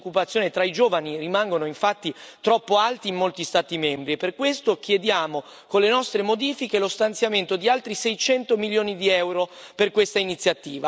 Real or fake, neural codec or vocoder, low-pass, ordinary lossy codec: real; none; none; none